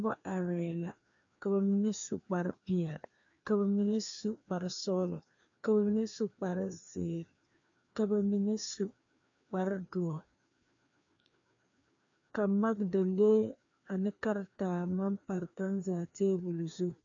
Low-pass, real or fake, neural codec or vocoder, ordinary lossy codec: 7.2 kHz; fake; codec, 16 kHz, 2 kbps, FreqCodec, larger model; MP3, 64 kbps